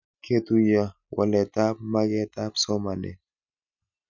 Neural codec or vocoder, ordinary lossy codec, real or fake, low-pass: none; none; real; 7.2 kHz